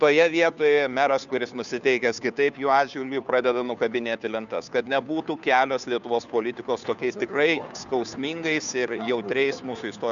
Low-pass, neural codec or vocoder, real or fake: 7.2 kHz; codec, 16 kHz, 2 kbps, FunCodec, trained on Chinese and English, 25 frames a second; fake